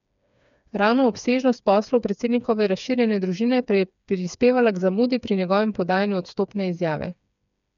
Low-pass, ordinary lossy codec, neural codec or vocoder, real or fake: 7.2 kHz; none; codec, 16 kHz, 4 kbps, FreqCodec, smaller model; fake